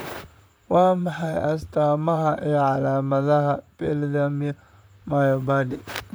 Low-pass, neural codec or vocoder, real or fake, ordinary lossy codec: none; vocoder, 44.1 kHz, 128 mel bands, Pupu-Vocoder; fake; none